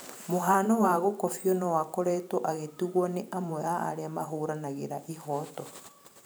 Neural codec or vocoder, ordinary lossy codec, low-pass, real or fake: vocoder, 44.1 kHz, 128 mel bands every 512 samples, BigVGAN v2; none; none; fake